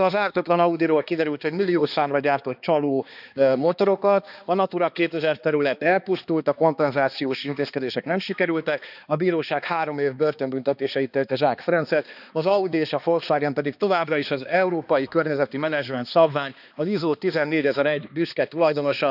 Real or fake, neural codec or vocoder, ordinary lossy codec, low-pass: fake; codec, 16 kHz, 2 kbps, X-Codec, HuBERT features, trained on balanced general audio; none; 5.4 kHz